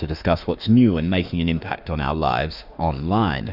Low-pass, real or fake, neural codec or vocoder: 5.4 kHz; fake; autoencoder, 48 kHz, 32 numbers a frame, DAC-VAE, trained on Japanese speech